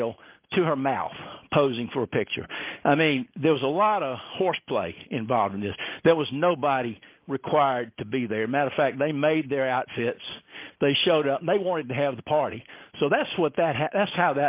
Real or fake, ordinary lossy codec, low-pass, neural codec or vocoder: real; Opus, 32 kbps; 3.6 kHz; none